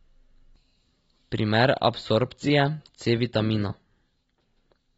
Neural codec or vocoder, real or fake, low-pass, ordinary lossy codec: none; real; 19.8 kHz; AAC, 24 kbps